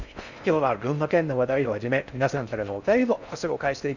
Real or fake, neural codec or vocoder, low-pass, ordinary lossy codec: fake; codec, 16 kHz in and 24 kHz out, 0.6 kbps, FocalCodec, streaming, 4096 codes; 7.2 kHz; none